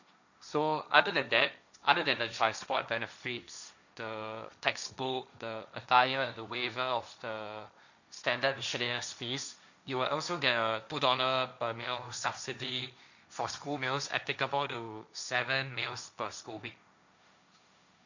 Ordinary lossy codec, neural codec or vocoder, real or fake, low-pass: none; codec, 16 kHz, 1.1 kbps, Voila-Tokenizer; fake; 7.2 kHz